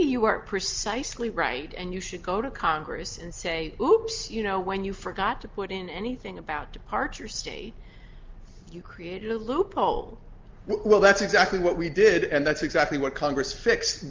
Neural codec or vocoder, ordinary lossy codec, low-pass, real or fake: none; Opus, 32 kbps; 7.2 kHz; real